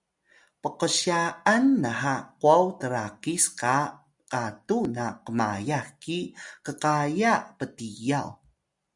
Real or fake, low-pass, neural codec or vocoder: real; 10.8 kHz; none